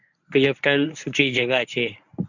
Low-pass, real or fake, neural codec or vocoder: 7.2 kHz; fake; codec, 24 kHz, 0.9 kbps, WavTokenizer, medium speech release version 1